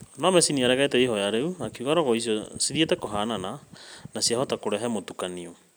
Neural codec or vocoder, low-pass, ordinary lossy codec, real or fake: none; none; none; real